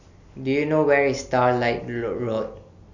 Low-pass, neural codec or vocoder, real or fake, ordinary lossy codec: 7.2 kHz; none; real; none